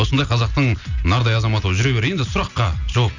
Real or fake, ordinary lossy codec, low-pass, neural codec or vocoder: real; none; 7.2 kHz; none